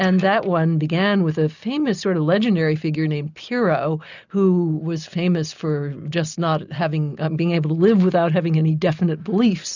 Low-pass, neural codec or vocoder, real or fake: 7.2 kHz; none; real